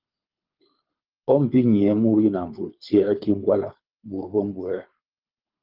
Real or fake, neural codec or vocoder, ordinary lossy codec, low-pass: fake; codec, 24 kHz, 6 kbps, HILCodec; Opus, 24 kbps; 5.4 kHz